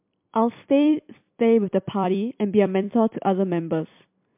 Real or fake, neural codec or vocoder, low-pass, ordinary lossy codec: fake; vocoder, 44.1 kHz, 128 mel bands every 256 samples, BigVGAN v2; 3.6 kHz; MP3, 32 kbps